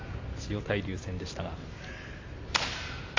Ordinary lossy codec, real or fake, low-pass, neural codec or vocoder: AAC, 32 kbps; real; 7.2 kHz; none